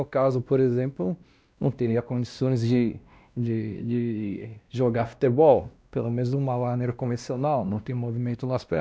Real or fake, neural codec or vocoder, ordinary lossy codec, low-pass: fake; codec, 16 kHz, 1 kbps, X-Codec, WavLM features, trained on Multilingual LibriSpeech; none; none